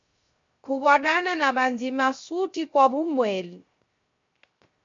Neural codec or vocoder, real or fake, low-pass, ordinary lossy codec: codec, 16 kHz, 0.3 kbps, FocalCodec; fake; 7.2 kHz; MP3, 48 kbps